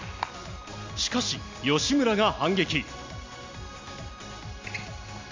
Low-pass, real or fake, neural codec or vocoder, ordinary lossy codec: 7.2 kHz; real; none; MP3, 48 kbps